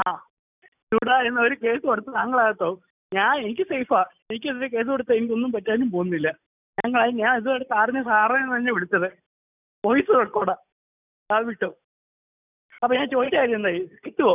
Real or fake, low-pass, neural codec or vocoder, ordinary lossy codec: real; 3.6 kHz; none; none